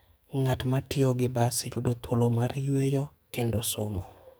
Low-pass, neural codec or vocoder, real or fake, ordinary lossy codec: none; codec, 44.1 kHz, 2.6 kbps, SNAC; fake; none